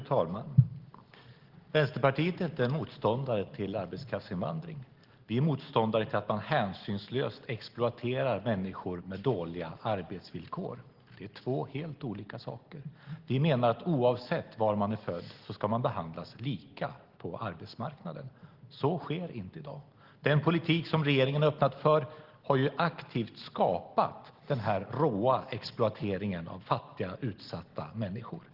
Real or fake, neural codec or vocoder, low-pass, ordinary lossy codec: real; none; 5.4 kHz; Opus, 16 kbps